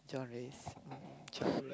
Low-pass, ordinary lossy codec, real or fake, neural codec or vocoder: none; none; real; none